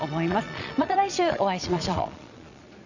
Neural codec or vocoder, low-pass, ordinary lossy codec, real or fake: vocoder, 22.05 kHz, 80 mel bands, Vocos; 7.2 kHz; none; fake